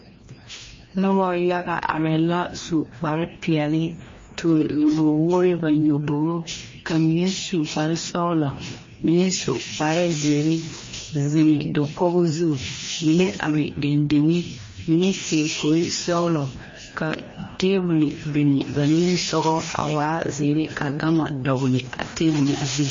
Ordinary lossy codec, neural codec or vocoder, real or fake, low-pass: MP3, 32 kbps; codec, 16 kHz, 1 kbps, FreqCodec, larger model; fake; 7.2 kHz